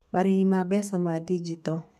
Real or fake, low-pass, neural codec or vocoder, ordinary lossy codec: fake; 14.4 kHz; codec, 32 kHz, 1.9 kbps, SNAC; MP3, 96 kbps